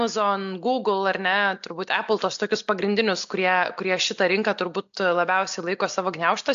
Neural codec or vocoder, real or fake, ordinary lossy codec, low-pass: none; real; AAC, 64 kbps; 7.2 kHz